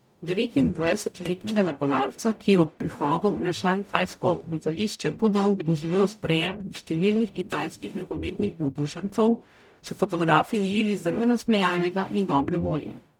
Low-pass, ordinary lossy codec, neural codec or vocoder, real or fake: 19.8 kHz; none; codec, 44.1 kHz, 0.9 kbps, DAC; fake